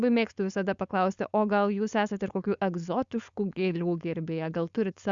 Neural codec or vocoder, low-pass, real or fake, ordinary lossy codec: codec, 16 kHz, 4.8 kbps, FACodec; 7.2 kHz; fake; Opus, 64 kbps